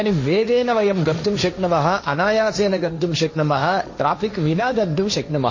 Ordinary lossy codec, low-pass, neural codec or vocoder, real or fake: MP3, 32 kbps; 7.2 kHz; codec, 16 kHz, 1.1 kbps, Voila-Tokenizer; fake